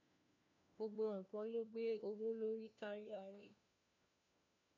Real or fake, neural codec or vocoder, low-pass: fake; codec, 16 kHz, 1 kbps, FunCodec, trained on LibriTTS, 50 frames a second; 7.2 kHz